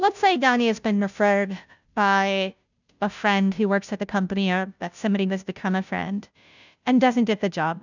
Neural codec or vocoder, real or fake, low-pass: codec, 16 kHz, 0.5 kbps, FunCodec, trained on Chinese and English, 25 frames a second; fake; 7.2 kHz